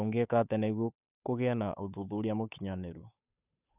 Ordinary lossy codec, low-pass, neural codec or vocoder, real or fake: none; 3.6 kHz; codec, 16 kHz, 8 kbps, FunCodec, trained on Chinese and English, 25 frames a second; fake